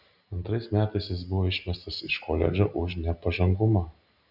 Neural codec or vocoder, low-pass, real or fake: none; 5.4 kHz; real